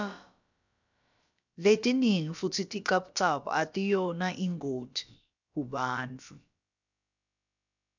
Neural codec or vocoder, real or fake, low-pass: codec, 16 kHz, about 1 kbps, DyCAST, with the encoder's durations; fake; 7.2 kHz